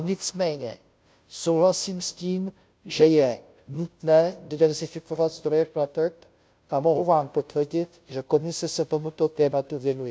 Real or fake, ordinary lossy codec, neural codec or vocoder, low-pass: fake; none; codec, 16 kHz, 0.5 kbps, FunCodec, trained on Chinese and English, 25 frames a second; none